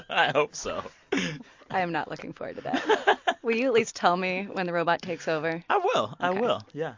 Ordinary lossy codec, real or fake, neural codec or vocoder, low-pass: MP3, 48 kbps; real; none; 7.2 kHz